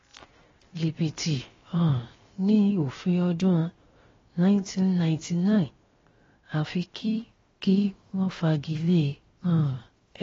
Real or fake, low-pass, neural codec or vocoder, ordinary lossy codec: fake; 7.2 kHz; codec, 16 kHz, 0.7 kbps, FocalCodec; AAC, 24 kbps